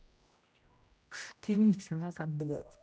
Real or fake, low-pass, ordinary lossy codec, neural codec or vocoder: fake; none; none; codec, 16 kHz, 0.5 kbps, X-Codec, HuBERT features, trained on general audio